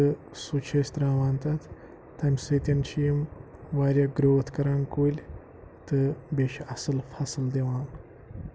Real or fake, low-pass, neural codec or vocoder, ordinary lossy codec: real; none; none; none